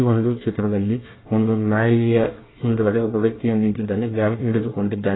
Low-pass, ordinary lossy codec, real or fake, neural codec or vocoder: 7.2 kHz; AAC, 16 kbps; fake; codec, 24 kHz, 1 kbps, SNAC